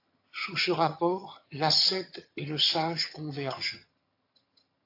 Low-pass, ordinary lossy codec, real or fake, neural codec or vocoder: 5.4 kHz; AAC, 32 kbps; fake; vocoder, 22.05 kHz, 80 mel bands, HiFi-GAN